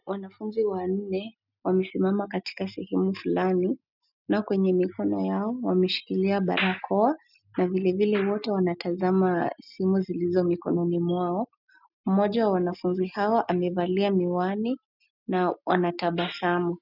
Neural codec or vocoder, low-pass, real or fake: none; 5.4 kHz; real